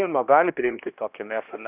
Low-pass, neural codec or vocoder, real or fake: 3.6 kHz; codec, 16 kHz, 1 kbps, X-Codec, HuBERT features, trained on balanced general audio; fake